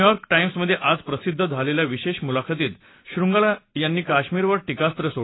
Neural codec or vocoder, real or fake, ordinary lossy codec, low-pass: none; real; AAC, 16 kbps; 7.2 kHz